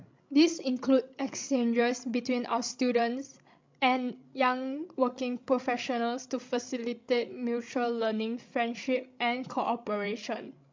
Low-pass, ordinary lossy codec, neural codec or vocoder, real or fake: 7.2 kHz; MP3, 64 kbps; codec, 16 kHz, 16 kbps, FreqCodec, larger model; fake